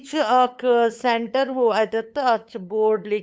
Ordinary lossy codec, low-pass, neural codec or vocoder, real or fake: none; none; codec, 16 kHz, 8 kbps, FunCodec, trained on LibriTTS, 25 frames a second; fake